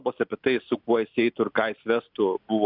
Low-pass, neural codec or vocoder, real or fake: 5.4 kHz; none; real